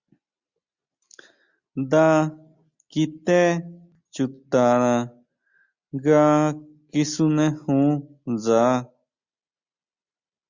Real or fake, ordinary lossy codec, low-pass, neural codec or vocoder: real; Opus, 64 kbps; 7.2 kHz; none